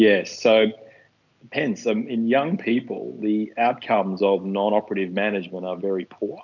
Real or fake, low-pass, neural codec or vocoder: real; 7.2 kHz; none